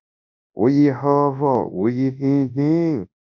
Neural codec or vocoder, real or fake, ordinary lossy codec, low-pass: codec, 24 kHz, 0.9 kbps, WavTokenizer, large speech release; fake; AAC, 48 kbps; 7.2 kHz